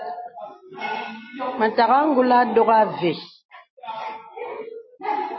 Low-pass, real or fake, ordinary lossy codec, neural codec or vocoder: 7.2 kHz; real; MP3, 24 kbps; none